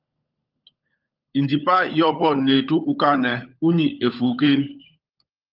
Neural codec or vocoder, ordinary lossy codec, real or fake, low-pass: codec, 16 kHz, 16 kbps, FunCodec, trained on LibriTTS, 50 frames a second; Opus, 24 kbps; fake; 5.4 kHz